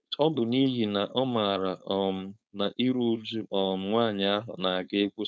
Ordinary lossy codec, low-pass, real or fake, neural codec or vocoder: none; none; fake; codec, 16 kHz, 4.8 kbps, FACodec